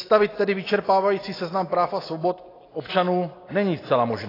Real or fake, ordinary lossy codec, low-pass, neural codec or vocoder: real; AAC, 24 kbps; 5.4 kHz; none